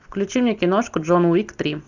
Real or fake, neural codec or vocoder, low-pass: real; none; 7.2 kHz